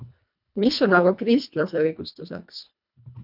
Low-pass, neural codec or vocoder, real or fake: 5.4 kHz; codec, 24 kHz, 1.5 kbps, HILCodec; fake